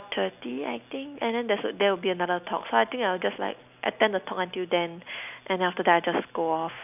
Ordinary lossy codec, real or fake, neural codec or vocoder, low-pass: none; real; none; 3.6 kHz